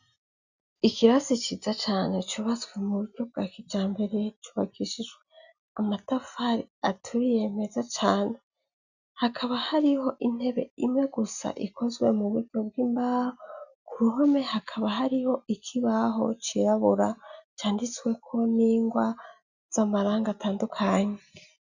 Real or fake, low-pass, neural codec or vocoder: real; 7.2 kHz; none